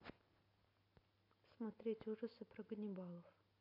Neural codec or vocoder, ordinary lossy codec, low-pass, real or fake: none; AAC, 32 kbps; 5.4 kHz; real